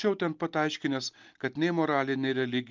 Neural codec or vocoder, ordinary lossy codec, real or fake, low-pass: none; Opus, 24 kbps; real; 7.2 kHz